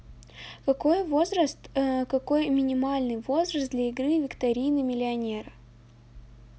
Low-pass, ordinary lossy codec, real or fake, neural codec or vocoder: none; none; real; none